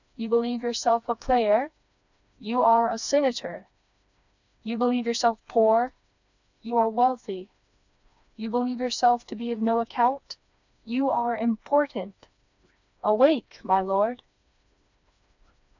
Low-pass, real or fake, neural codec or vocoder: 7.2 kHz; fake; codec, 16 kHz, 2 kbps, FreqCodec, smaller model